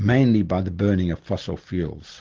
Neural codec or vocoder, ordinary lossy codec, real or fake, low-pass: none; Opus, 16 kbps; real; 7.2 kHz